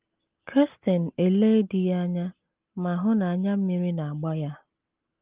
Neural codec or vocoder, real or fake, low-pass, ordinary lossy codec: none; real; 3.6 kHz; Opus, 32 kbps